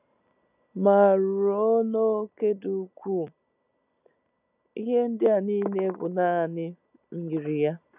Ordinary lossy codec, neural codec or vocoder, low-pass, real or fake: none; none; 3.6 kHz; real